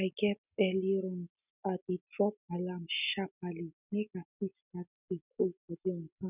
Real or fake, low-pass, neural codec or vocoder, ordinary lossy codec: real; 3.6 kHz; none; none